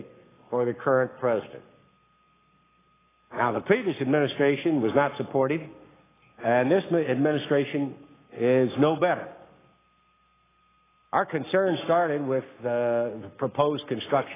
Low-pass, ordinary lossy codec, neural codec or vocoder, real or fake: 3.6 kHz; AAC, 16 kbps; codec, 44.1 kHz, 7.8 kbps, Pupu-Codec; fake